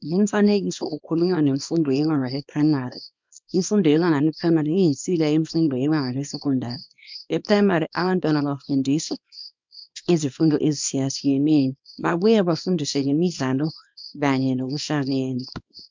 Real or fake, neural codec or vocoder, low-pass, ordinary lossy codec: fake; codec, 24 kHz, 0.9 kbps, WavTokenizer, small release; 7.2 kHz; MP3, 64 kbps